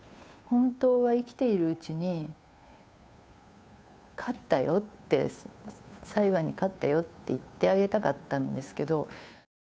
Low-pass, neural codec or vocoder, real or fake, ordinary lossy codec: none; codec, 16 kHz, 2 kbps, FunCodec, trained on Chinese and English, 25 frames a second; fake; none